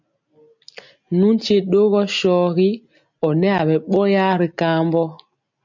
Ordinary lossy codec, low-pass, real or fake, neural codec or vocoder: MP3, 64 kbps; 7.2 kHz; real; none